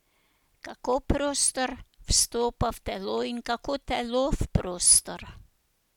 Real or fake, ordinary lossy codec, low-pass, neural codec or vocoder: real; none; 19.8 kHz; none